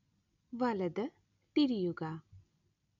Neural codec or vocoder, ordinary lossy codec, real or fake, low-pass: none; none; real; 7.2 kHz